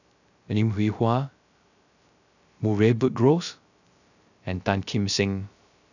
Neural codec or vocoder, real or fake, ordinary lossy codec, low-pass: codec, 16 kHz, 0.3 kbps, FocalCodec; fake; none; 7.2 kHz